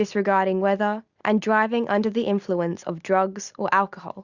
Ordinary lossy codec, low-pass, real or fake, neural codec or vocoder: Opus, 64 kbps; 7.2 kHz; real; none